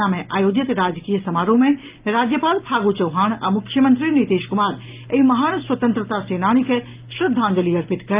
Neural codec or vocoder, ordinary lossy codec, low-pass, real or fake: none; Opus, 24 kbps; 3.6 kHz; real